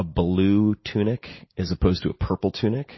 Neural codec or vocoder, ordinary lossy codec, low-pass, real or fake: none; MP3, 24 kbps; 7.2 kHz; real